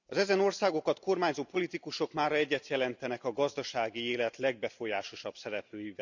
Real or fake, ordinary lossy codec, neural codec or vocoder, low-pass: real; none; none; 7.2 kHz